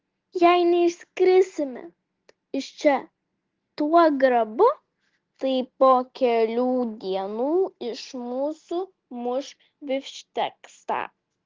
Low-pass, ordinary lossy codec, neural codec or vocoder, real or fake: 7.2 kHz; Opus, 16 kbps; none; real